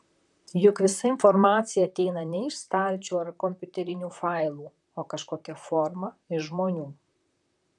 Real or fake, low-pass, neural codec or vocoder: fake; 10.8 kHz; vocoder, 44.1 kHz, 128 mel bands, Pupu-Vocoder